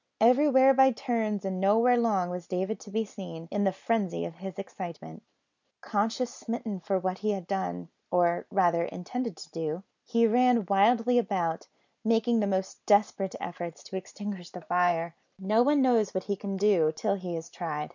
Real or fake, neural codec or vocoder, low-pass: real; none; 7.2 kHz